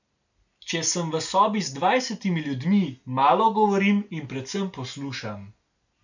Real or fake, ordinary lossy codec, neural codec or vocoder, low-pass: real; none; none; 7.2 kHz